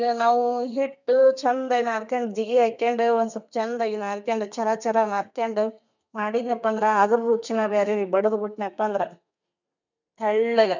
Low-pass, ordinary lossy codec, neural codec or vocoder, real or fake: 7.2 kHz; none; codec, 32 kHz, 1.9 kbps, SNAC; fake